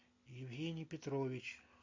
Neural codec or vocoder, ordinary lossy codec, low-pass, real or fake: none; MP3, 48 kbps; 7.2 kHz; real